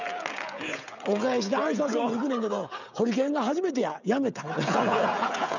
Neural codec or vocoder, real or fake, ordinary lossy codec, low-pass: codec, 16 kHz, 16 kbps, FreqCodec, smaller model; fake; none; 7.2 kHz